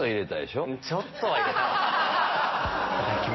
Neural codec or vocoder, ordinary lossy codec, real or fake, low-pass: none; MP3, 24 kbps; real; 7.2 kHz